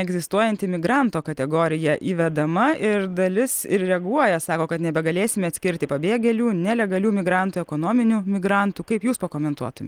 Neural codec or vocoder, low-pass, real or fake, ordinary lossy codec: none; 19.8 kHz; real; Opus, 24 kbps